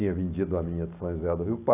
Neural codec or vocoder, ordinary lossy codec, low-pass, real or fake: none; none; 3.6 kHz; real